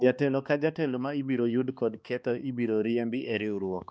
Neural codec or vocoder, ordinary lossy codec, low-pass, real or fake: codec, 16 kHz, 2 kbps, X-Codec, HuBERT features, trained on balanced general audio; none; none; fake